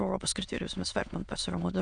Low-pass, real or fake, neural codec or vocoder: 9.9 kHz; fake; autoencoder, 22.05 kHz, a latent of 192 numbers a frame, VITS, trained on many speakers